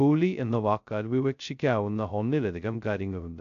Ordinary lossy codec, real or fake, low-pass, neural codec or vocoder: AAC, 64 kbps; fake; 7.2 kHz; codec, 16 kHz, 0.2 kbps, FocalCodec